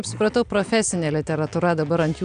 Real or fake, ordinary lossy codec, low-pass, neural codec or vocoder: real; MP3, 96 kbps; 9.9 kHz; none